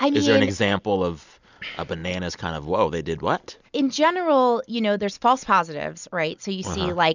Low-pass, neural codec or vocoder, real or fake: 7.2 kHz; none; real